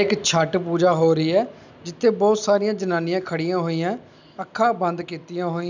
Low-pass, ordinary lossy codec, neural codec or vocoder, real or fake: 7.2 kHz; none; none; real